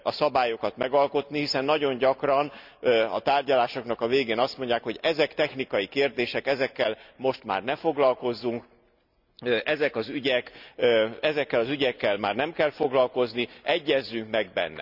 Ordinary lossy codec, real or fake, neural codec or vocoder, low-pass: none; real; none; 5.4 kHz